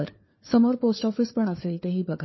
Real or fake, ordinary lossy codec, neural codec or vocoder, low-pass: fake; MP3, 24 kbps; codec, 16 kHz, 4 kbps, FunCodec, trained on LibriTTS, 50 frames a second; 7.2 kHz